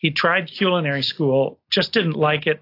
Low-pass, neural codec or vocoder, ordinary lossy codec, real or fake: 5.4 kHz; none; AAC, 32 kbps; real